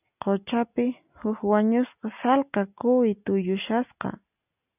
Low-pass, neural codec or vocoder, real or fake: 3.6 kHz; none; real